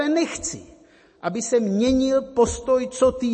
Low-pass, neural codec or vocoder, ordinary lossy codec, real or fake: 10.8 kHz; none; MP3, 32 kbps; real